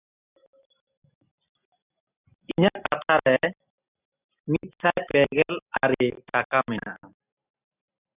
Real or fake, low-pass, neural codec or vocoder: real; 3.6 kHz; none